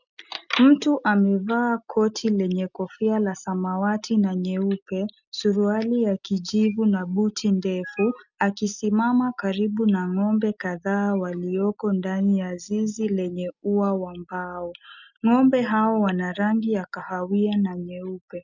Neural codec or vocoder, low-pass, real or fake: none; 7.2 kHz; real